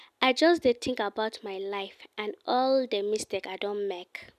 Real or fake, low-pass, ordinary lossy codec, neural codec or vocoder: real; 14.4 kHz; none; none